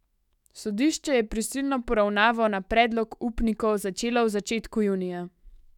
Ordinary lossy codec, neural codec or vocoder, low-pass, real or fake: none; autoencoder, 48 kHz, 128 numbers a frame, DAC-VAE, trained on Japanese speech; 19.8 kHz; fake